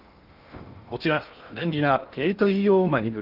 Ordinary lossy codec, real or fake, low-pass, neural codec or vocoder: Opus, 32 kbps; fake; 5.4 kHz; codec, 16 kHz in and 24 kHz out, 0.6 kbps, FocalCodec, streaming, 2048 codes